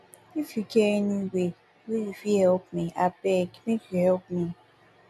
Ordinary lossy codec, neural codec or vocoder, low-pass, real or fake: none; none; 14.4 kHz; real